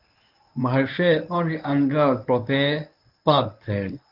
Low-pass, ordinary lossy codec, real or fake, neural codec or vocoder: 5.4 kHz; Opus, 24 kbps; fake; codec, 24 kHz, 0.9 kbps, WavTokenizer, medium speech release version 1